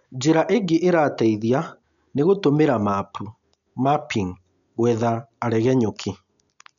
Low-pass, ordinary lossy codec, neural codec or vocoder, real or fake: 7.2 kHz; none; none; real